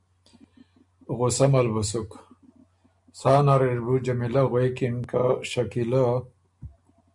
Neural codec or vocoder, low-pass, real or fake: none; 10.8 kHz; real